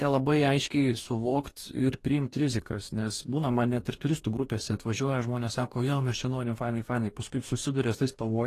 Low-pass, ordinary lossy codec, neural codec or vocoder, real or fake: 14.4 kHz; AAC, 48 kbps; codec, 44.1 kHz, 2.6 kbps, DAC; fake